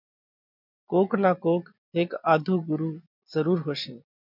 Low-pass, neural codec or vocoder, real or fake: 5.4 kHz; none; real